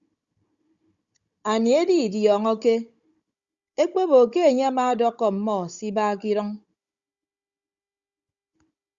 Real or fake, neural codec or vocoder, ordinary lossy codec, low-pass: fake; codec, 16 kHz, 16 kbps, FunCodec, trained on Chinese and English, 50 frames a second; Opus, 64 kbps; 7.2 kHz